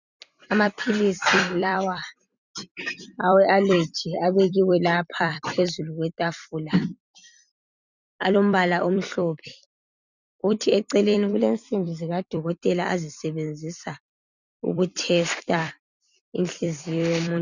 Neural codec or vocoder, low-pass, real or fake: vocoder, 44.1 kHz, 128 mel bands every 256 samples, BigVGAN v2; 7.2 kHz; fake